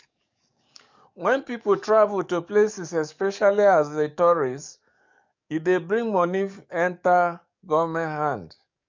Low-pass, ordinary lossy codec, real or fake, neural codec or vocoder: 7.2 kHz; MP3, 64 kbps; fake; codec, 44.1 kHz, 7.8 kbps, DAC